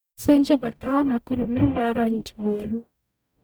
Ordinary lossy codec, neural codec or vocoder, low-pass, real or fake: none; codec, 44.1 kHz, 0.9 kbps, DAC; none; fake